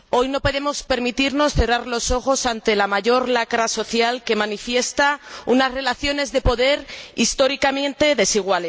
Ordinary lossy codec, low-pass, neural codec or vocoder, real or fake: none; none; none; real